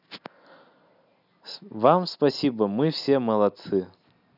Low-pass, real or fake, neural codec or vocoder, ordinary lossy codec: 5.4 kHz; real; none; none